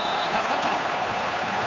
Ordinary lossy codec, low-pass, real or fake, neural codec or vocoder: MP3, 64 kbps; 7.2 kHz; fake; codec, 16 kHz, 2 kbps, FunCodec, trained on Chinese and English, 25 frames a second